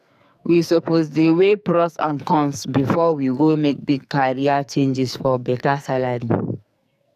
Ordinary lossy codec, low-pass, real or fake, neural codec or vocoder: none; 14.4 kHz; fake; codec, 44.1 kHz, 2.6 kbps, SNAC